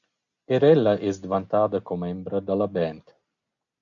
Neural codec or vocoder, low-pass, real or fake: none; 7.2 kHz; real